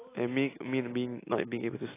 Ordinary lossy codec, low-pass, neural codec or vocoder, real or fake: AAC, 16 kbps; 3.6 kHz; none; real